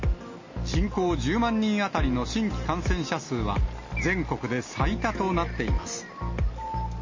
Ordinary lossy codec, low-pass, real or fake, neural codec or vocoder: MP3, 32 kbps; 7.2 kHz; real; none